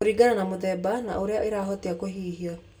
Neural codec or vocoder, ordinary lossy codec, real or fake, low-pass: none; none; real; none